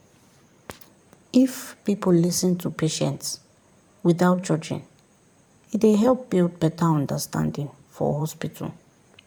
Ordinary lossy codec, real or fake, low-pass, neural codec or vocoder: none; fake; 19.8 kHz; vocoder, 44.1 kHz, 128 mel bands every 512 samples, BigVGAN v2